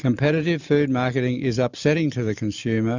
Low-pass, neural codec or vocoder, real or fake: 7.2 kHz; none; real